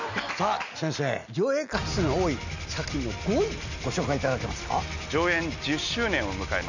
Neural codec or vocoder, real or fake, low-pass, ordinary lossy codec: none; real; 7.2 kHz; none